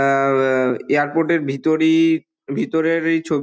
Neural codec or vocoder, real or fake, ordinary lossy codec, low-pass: none; real; none; none